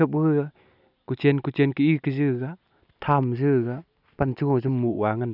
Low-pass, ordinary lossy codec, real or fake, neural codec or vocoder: 5.4 kHz; none; real; none